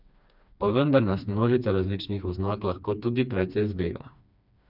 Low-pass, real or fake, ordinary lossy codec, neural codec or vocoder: 5.4 kHz; fake; none; codec, 16 kHz, 2 kbps, FreqCodec, smaller model